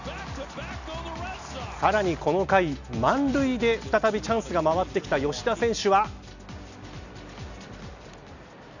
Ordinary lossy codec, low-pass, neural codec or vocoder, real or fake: none; 7.2 kHz; none; real